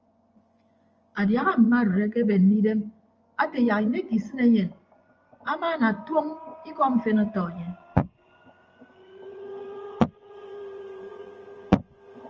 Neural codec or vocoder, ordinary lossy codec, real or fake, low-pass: vocoder, 24 kHz, 100 mel bands, Vocos; Opus, 24 kbps; fake; 7.2 kHz